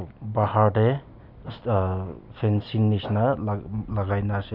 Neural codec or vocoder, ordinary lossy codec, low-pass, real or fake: none; none; 5.4 kHz; real